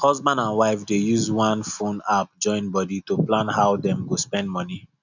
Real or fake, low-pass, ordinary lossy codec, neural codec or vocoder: real; 7.2 kHz; none; none